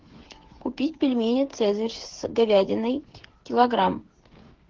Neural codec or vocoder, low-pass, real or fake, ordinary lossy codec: codec, 16 kHz, 8 kbps, FreqCodec, smaller model; 7.2 kHz; fake; Opus, 16 kbps